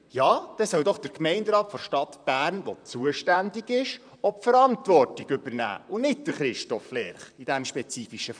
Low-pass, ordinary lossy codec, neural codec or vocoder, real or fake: 9.9 kHz; none; vocoder, 44.1 kHz, 128 mel bands, Pupu-Vocoder; fake